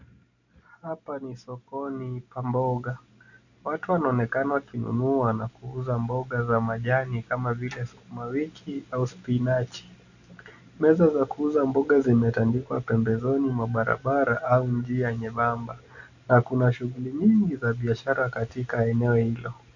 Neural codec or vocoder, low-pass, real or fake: none; 7.2 kHz; real